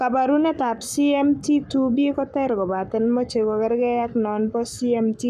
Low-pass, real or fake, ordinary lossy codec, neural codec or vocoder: 14.4 kHz; fake; none; codec, 44.1 kHz, 7.8 kbps, Pupu-Codec